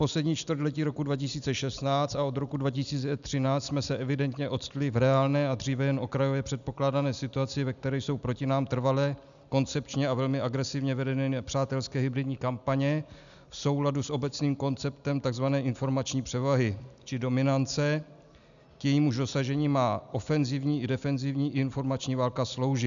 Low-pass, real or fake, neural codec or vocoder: 7.2 kHz; real; none